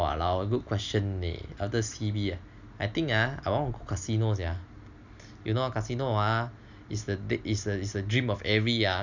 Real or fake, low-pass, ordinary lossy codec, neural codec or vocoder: real; 7.2 kHz; none; none